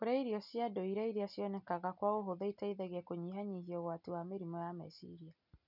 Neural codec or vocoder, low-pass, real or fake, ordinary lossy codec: none; 5.4 kHz; real; none